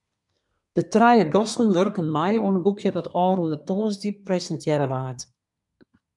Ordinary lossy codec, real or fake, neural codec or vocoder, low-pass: MP3, 96 kbps; fake; codec, 24 kHz, 1 kbps, SNAC; 10.8 kHz